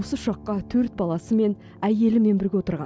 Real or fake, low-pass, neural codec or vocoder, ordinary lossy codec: real; none; none; none